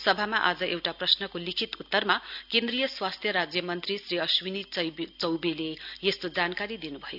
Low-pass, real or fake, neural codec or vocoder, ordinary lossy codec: 5.4 kHz; real; none; none